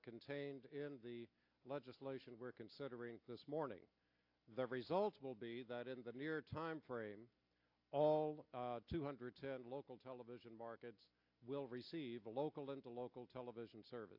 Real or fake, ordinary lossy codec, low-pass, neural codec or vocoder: real; AAC, 48 kbps; 5.4 kHz; none